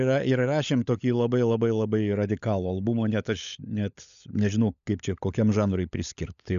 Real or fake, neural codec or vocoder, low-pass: fake; codec, 16 kHz, 16 kbps, FunCodec, trained on LibriTTS, 50 frames a second; 7.2 kHz